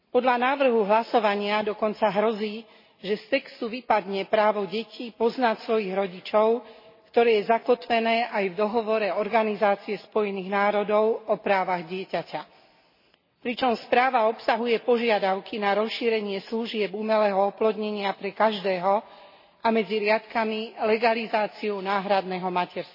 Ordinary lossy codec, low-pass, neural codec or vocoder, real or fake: MP3, 24 kbps; 5.4 kHz; none; real